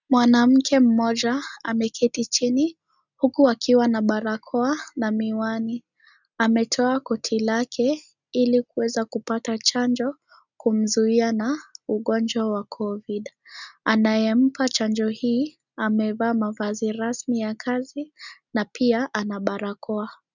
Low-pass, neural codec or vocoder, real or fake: 7.2 kHz; none; real